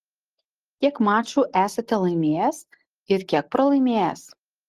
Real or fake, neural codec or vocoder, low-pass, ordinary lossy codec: real; none; 14.4 kHz; Opus, 16 kbps